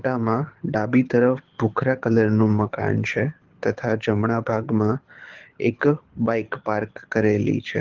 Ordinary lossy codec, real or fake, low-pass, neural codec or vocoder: Opus, 16 kbps; fake; 7.2 kHz; codec, 24 kHz, 6 kbps, HILCodec